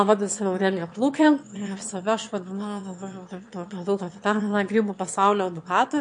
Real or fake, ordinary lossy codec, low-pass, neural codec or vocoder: fake; MP3, 48 kbps; 9.9 kHz; autoencoder, 22.05 kHz, a latent of 192 numbers a frame, VITS, trained on one speaker